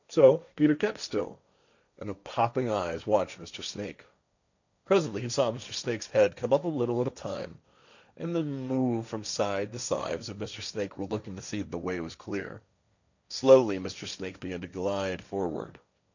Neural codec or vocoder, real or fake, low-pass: codec, 16 kHz, 1.1 kbps, Voila-Tokenizer; fake; 7.2 kHz